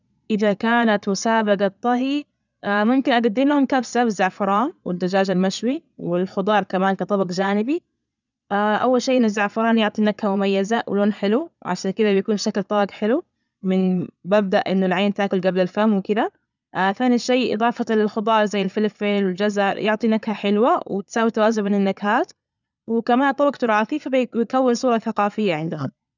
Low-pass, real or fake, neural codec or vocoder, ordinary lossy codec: 7.2 kHz; fake; vocoder, 22.05 kHz, 80 mel bands, Vocos; none